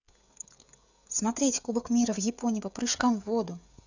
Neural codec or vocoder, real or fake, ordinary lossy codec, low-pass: codec, 16 kHz, 16 kbps, FreqCodec, smaller model; fake; none; 7.2 kHz